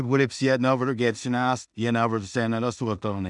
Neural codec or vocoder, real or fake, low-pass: codec, 16 kHz in and 24 kHz out, 0.4 kbps, LongCat-Audio-Codec, two codebook decoder; fake; 10.8 kHz